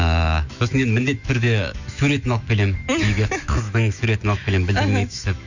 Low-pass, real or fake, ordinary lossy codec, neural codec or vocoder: 7.2 kHz; fake; Opus, 64 kbps; autoencoder, 48 kHz, 128 numbers a frame, DAC-VAE, trained on Japanese speech